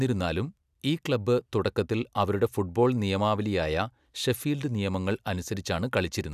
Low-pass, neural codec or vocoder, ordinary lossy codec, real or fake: 14.4 kHz; none; none; real